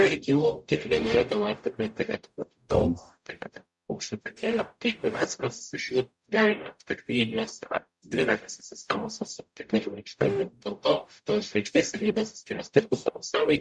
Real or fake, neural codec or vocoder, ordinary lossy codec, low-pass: fake; codec, 44.1 kHz, 0.9 kbps, DAC; MP3, 96 kbps; 10.8 kHz